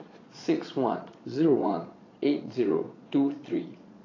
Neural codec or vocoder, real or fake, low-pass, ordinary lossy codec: vocoder, 22.05 kHz, 80 mel bands, Vocos; fake; 7.2 kHz; AAC, 32 kbps